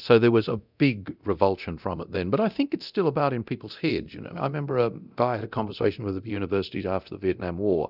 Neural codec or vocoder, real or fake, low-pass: codec, 24 kHz, 0.9 kbps, DualCodec; fake; 5.4 kHz